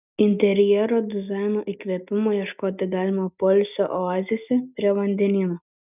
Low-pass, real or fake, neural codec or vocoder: 3.6 kHz; real; none